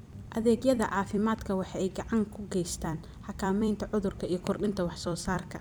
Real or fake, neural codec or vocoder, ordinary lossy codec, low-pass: fake; vocoder, 44.1 kHz, 128 mel bands every 256 samples, BigVGAN v2; none; none